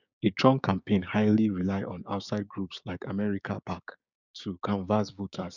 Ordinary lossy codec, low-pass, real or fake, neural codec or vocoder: none; 7.2 kHz; fake; codec, 16 kHz, 6 kbps, DAC